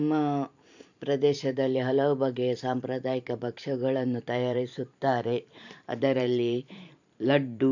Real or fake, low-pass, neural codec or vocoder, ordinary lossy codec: fake; 7.2 kHz; vocoder, 44.1 kHz, 128 mel bands every 512 samples, BigVGAN v2; none